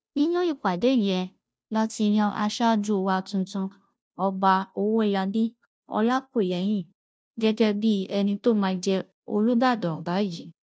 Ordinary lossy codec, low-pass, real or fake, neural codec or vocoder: none; none; fake; codec, 16 kHz, 0.5 kbps, FunCodec, trained on Chinese and English, 25 frames a second